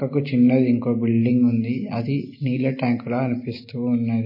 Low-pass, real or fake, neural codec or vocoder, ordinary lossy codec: 5.4 kHz; real; none; MP3, 24 kbps